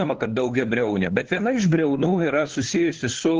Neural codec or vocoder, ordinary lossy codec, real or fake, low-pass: codec, 16 kHz, 2 kbps, FunCodec, trained on LibriTTS, 25 frames a second; Opus, 16 kbps; fake; 7.2 kHz